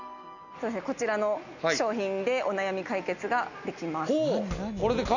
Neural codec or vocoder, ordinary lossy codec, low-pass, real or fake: none; none; 7.2 kHz; real